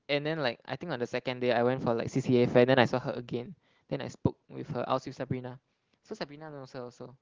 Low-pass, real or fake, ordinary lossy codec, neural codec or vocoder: 7.2 kHz; real; Opus, 16 kbps; none